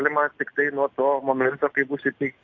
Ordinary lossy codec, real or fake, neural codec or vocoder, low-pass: AAC, 48 kbps; real; none; 7.2 kHz